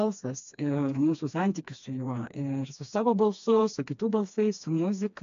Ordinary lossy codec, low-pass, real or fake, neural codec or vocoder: AAC, 64 kbps; 7.2 kHz; fake; codec, 16 kHz, 2 kbps, FreqCodec, smaller model